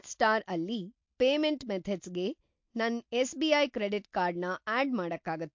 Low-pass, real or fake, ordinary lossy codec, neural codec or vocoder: 7.2 kHz; real; MP3, 48 kbps; none